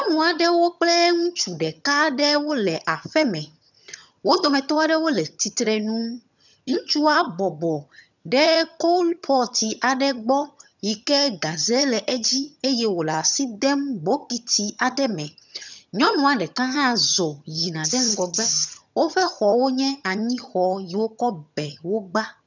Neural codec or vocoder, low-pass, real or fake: vocoder, 22.05 kHz, 80 mel bands, HiFi-GAN; 7.2 kHz; fake